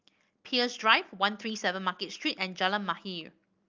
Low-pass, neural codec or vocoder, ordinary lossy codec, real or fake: 7.2 kHz; none; Opus, 24 kbps; real